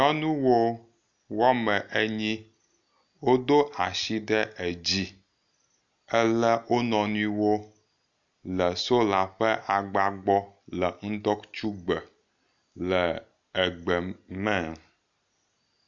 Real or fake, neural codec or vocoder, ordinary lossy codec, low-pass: real; none; MP3, 64 kbps; 7.2 kHz